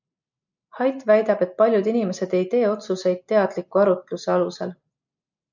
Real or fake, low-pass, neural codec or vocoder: real; 7.2 kHz; none